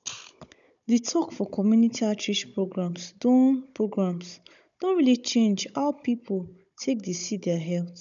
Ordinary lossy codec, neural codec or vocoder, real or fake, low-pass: none; codec, 16 kHz, 16 kbps, FunCodec, trained on Chinese and English, 50 frames a second; fake; 7.2 kHz